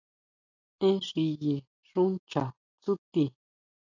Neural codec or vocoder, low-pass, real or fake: none; 7.2 kHz; real